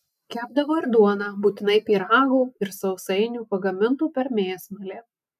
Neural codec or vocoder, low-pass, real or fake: none; 14.4 kHz; real